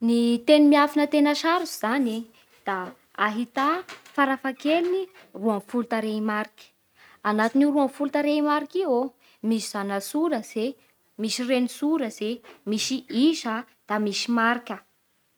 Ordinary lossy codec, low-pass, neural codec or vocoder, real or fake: none; none; none; real